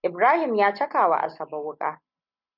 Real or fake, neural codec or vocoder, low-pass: real; none; 5.4 kHz